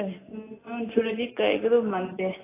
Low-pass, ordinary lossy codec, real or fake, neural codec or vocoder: 3.6 kHz; AAC, 16 kbps; real; none